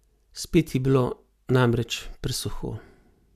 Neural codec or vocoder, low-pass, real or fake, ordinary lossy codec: none; 14.4 kHz; real; MP3, 96 kbps